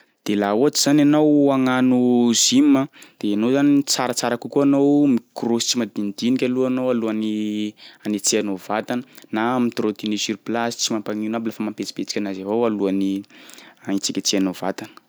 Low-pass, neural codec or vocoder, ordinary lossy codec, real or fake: none; none; none; real